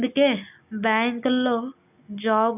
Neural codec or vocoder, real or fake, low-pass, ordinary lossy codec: none; real; 3.6 kHz; none